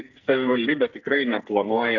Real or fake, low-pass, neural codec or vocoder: fake; 7.2 kHz; codec, 44.1 kHz, 2.6 kbps, SNAC